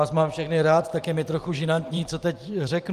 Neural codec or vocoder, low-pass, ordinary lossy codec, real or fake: vocoder, 44.1 kHz, 128 mel bands every 512 samples, BigVGAN v2; 14.4 kHz; Opus, 32 kbps; fake